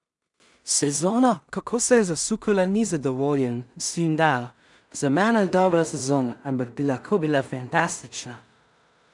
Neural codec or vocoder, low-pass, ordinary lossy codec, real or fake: codec, 16 kHz in and 24 kHz out, 0.4 kbps, LongCat-Audio-Codec, two codebook decoder; 10.8 kHz; none; fake